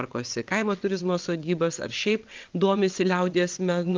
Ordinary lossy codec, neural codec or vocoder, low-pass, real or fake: Opus, 32 kbps; vocoder, 22.05 kHz, 80 mel bands, WaveNeXt; 7.2 kHz; fake